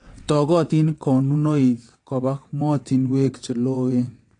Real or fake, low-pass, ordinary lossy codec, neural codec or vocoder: fake; 9.9 kHz; AAC, 48 kbps; vocoder, 22.05 kHz, 80 mel bands, WaveNeXt